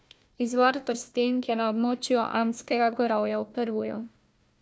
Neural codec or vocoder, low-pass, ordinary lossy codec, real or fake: codec, 16 kHz, 1 kbps, FunCodec, trained on Chinese and English, 50 frames a second; none; none; fake